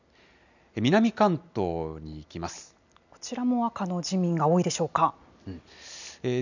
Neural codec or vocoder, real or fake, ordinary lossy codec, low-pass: none; real; none; 7.2 kHz